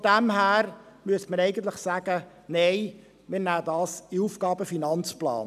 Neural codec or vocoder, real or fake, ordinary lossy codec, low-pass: none; real; none; 14.4 kHz